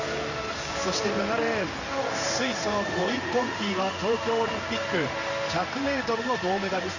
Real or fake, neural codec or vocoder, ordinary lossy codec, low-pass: fake; codec, 16 kHz in and 24 kHz out, 1 kbps, XY-Tokenizer; none; 7.2 kHz